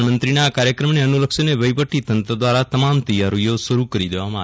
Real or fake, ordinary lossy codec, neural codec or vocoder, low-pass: real; none; none; none